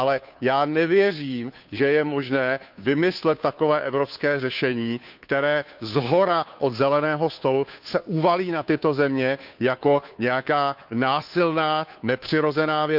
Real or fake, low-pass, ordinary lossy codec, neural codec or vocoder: fake; 5.4 kHz; none; codec, 16 kHz, 2 kbps, FunCodec, trained on Chinese and English, 25 frames a second